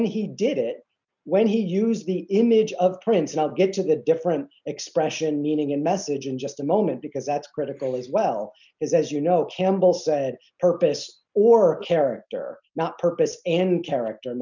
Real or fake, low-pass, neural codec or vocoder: real; 7.2 kHz; none